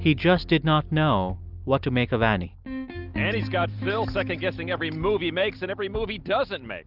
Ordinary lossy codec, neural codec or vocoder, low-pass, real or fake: Opus, 32 kbps; none; 5.4 kHz; real